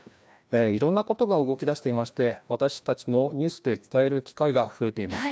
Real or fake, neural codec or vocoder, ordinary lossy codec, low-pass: fake; codec, 16 kHz, 1 kbps, FreqCodec, larger model; none; none